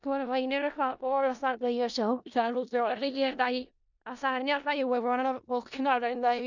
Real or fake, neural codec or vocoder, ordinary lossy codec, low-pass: fake; codec, 16 kHz in and 24 kHz out, 0.4 kbps, LongCat-Audio-Codec, four codebook decoder; none; 7.2 kHz